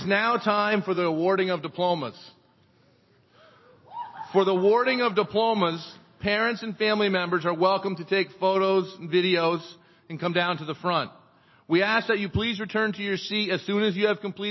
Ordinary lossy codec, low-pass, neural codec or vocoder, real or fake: MP3, 24 kbps; 7.2 kHz; none; real